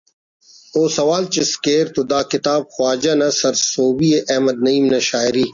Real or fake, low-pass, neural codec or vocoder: real; 7.2 kHz; none